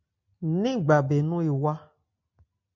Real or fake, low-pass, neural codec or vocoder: real; 7.2 kHz; none